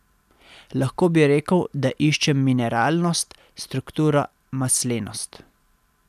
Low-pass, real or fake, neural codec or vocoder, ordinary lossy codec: 14.4 kHz; real; none; none